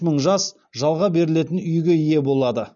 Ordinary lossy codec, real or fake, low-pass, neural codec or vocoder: none; real; 7.2 kHz; none